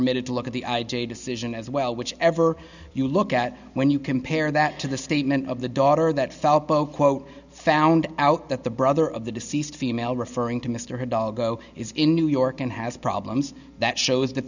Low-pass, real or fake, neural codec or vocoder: 7.2 kHz; real; none